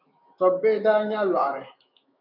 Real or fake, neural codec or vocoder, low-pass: fake; autoencoder, 48 kHz, 128 numbers a frame, DAC-VAE, trained on Japanese speech; 5.4 kHz